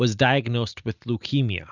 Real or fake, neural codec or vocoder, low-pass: real; none; 7.2 kHz